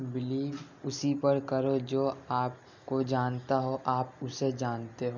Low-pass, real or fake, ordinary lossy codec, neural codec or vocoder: 7.2 kHz; real; none; none